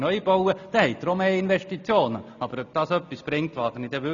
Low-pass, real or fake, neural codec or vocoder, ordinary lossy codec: 7.2 kHz; real; none; none